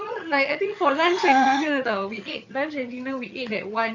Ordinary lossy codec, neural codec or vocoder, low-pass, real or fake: none; vocoder, 22.05 kHz, 80 mel bands, HiFi-GAN; 7.2 kHz; fake